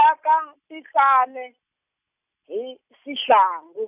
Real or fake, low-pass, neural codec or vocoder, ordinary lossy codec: real; 3.6 kHz; none; none